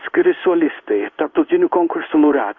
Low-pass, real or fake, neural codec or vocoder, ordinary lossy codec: 7.2 kHz; fake; codec, 16 kHz in and 24 kHz out, 1 kbps, XY-Tokenizer; Opus, 64 kbps